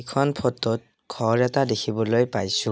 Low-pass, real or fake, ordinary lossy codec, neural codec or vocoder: none; real; none; none